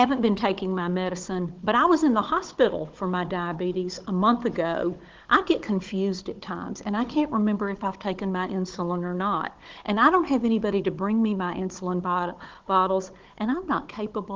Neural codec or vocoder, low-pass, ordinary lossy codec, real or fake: codec, 16 kHz, 16 kbps, FunCodec, trained on Chinese and English, 50 frames a second; 7.2 kHz; Opus, 16 kbps; fake